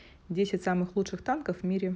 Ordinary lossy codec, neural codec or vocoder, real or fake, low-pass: none; none; real; none